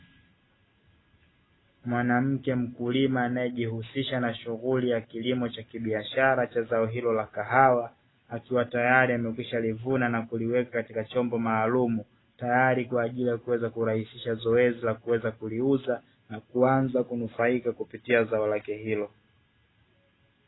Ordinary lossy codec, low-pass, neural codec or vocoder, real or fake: AAC, 16 kbps; 7.2 kHz; none; real